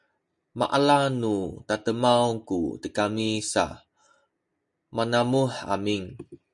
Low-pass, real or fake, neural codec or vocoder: 10.8 kHz; real; none